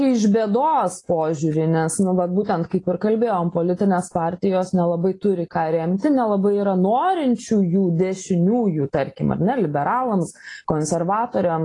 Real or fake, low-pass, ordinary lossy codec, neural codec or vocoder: real; 10.8 kHz; AAC, 32 kbps; none